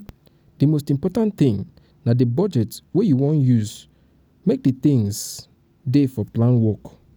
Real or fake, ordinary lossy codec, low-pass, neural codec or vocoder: real; none; none; none